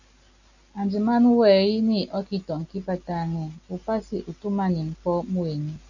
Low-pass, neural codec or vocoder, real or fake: 7.2 kHz; none; real